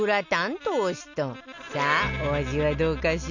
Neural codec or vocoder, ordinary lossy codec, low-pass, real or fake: none; none; 7.2 kHz; real